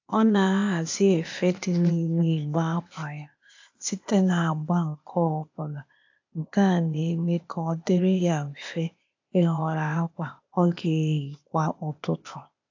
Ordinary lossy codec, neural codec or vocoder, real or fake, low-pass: AAC, 48 kbps; codec, 16 kHz, 0.8 kbps, ZipCodec; fake; 7.2 kHz